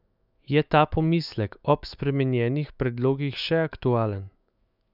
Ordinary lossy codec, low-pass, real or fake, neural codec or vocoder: none; 5.4 kHz; fake; autoencoder, 48 kHz, 128 numbers a frame, DAC-VAE, trained on Japanese speech